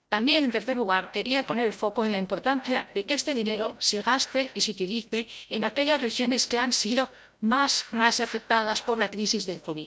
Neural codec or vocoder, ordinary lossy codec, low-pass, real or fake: codec, 16 kHz, 0.5 kbps, FreqCodec, larger model; none; none; fake